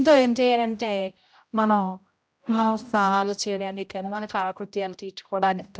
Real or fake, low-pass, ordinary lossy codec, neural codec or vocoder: fake; none; none; codec, 16 kHz, 0.5 kbps, X-Codec, HuBERT features, trained on general audio